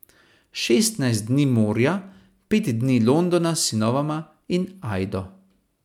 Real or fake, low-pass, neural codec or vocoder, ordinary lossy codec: real; 19.8 kHz; none; MP3, 96 kbps